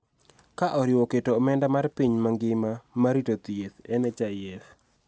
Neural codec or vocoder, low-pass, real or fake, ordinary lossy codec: none; none; real; none